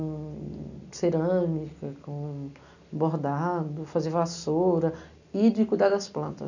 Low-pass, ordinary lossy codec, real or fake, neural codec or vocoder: 7.2 kHz; none; real; none